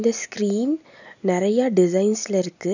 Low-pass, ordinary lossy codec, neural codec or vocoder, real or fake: 7.2 kHz; none; none; real